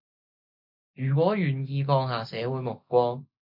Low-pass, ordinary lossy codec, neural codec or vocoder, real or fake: 5.4 kHz; MP3, 48 kbps; none; real